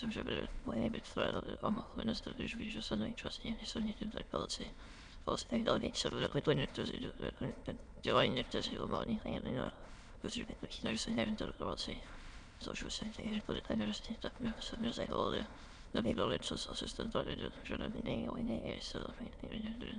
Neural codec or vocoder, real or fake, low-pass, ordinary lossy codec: autoencoder, 22.05 kHz, a latent of 192 numbers a frame, VITS, trained on many speakers; fake; 9.9 kHz; MP3, 96 kbps